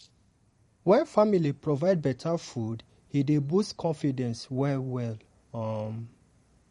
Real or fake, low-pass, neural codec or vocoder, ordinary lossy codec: fake; 19.8 kHz; vocoder, 44.1 kHz, 128 mel bands every 512 samples, BigVGAN v2; MP3, 48 kbps